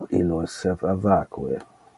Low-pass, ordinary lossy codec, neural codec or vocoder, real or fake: 14.4 kHz; MP3, 48 kbps; none; real